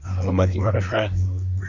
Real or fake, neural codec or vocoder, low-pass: fake; codec, 24 kHz, 1 kbps, SNAC; 7.2 kHz